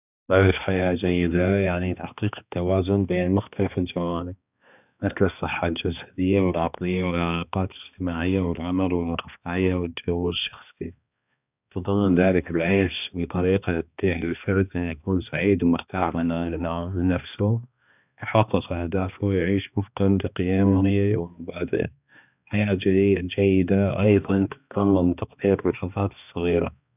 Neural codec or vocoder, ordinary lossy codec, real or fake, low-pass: codec, 16 kHz, 2 kbps, X-Codec, HuBERT features, trained on balanced general audio; none; fake; 3.6 kHz